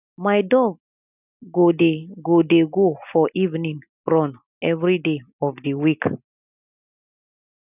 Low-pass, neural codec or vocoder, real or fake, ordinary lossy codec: 3.6 kHz; none; real; none